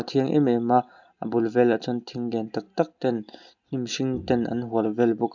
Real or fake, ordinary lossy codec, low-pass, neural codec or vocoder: real; none; 7.2 kHz; none